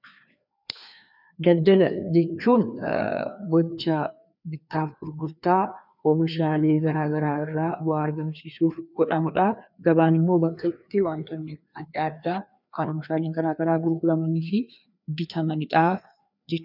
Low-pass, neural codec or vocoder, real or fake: 5.4 kHz; codec, 16 kHz, 2 kbps, FreqCodec, larger model; fake